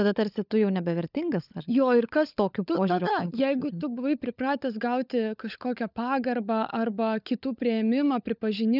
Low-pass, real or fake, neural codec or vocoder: 5.4 kHz; fake; codec, 16 kHz, 16 kbps, FunCodec, trained on LibriTTS, 50 frames a second